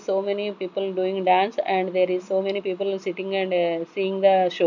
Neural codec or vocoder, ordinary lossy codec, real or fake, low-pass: none; none; real; 7.2 kHz